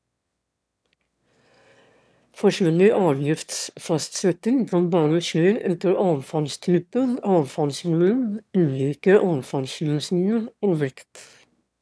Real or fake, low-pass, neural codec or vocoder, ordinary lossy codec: fake; none; autoencoder, 22.05 kHz, a latent of 192 numbers a frame, VITS, trained on one speaker; none